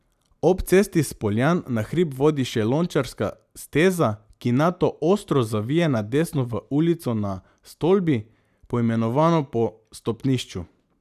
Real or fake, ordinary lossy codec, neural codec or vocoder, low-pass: real; none; none; 14.4 kHz